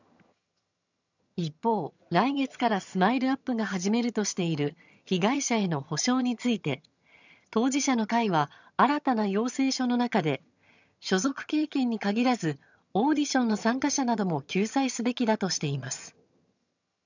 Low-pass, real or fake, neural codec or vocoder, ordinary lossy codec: 7.2 kHz; fake; vocoder, 22.05 kHz, 80 mel bands, HiFi-GAN; none